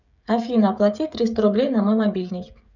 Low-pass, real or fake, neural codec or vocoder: 7.2 kHz; fake; codec, 16 kHz, 16 kbps, FreqCodec, smaller model